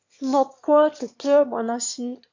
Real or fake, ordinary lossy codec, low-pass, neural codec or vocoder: fake; MP3, 48 kbps; 7.2 kHz; autoencoder, 22.05 kHz, a latent of 192 numbers a frame, VITS, trained on one speaker